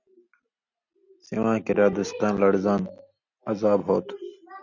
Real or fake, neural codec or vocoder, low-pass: real; none; 7.2 kHz